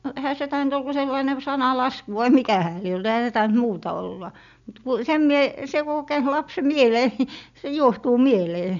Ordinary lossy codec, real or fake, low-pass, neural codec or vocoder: none; real; 7.2 kHz; none